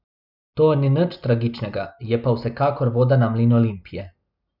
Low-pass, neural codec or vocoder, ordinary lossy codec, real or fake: 5.4 kHz; none; none; real